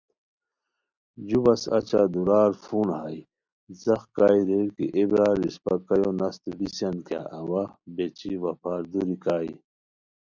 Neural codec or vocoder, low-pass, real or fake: none; 7.2 kHz; real